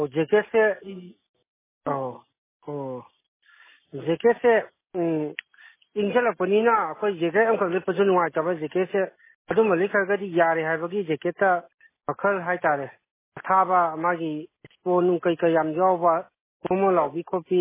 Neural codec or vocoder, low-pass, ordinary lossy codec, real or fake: none; 3.6 kHz; MP3, 16 kbps; real